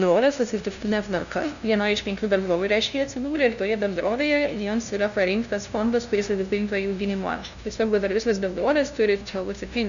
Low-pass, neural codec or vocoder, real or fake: 7.2 kHz; codec, 16 kHz, 0.5 kbps, FunCodec, trained on LibriTTS, 25 frames a second; fake